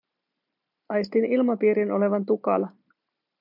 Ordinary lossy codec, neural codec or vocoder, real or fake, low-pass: MP3, 48 kbps; none; real; 5.4 kHz